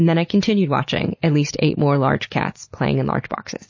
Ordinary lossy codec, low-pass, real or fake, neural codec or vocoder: MP3, 32 kbps; 7.2 kHz; real; none